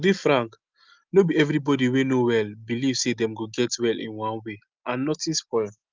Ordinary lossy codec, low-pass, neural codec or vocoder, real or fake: Opus, 24 kbps; 7.2 kHz; none; real